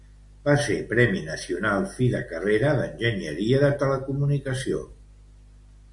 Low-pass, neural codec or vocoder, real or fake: 10.8 kHz; none; real